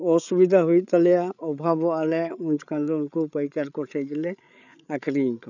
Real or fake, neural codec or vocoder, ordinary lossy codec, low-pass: fake; codec, 16 kHz, 8 kbps, FreqCodec, larger model; none; 7.2 kHz